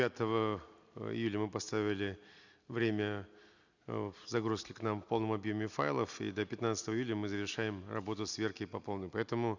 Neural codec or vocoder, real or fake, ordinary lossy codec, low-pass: none; real; none; 7.2 kHz